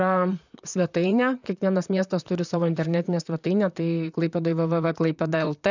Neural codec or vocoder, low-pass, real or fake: vocoder, 44.1 kHz, 128 mel bands, Pupu-Vocoder; 7.2 kHz; fake